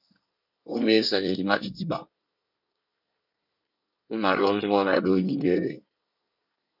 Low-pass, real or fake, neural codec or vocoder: 5.4 kHz; fake; codec, 24 kHz, 1 kbps, SNAC